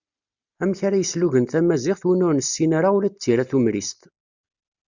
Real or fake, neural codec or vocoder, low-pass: real; none; 7.2 kHz